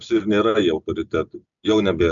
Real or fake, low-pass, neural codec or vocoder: real; 7.2 kHz; none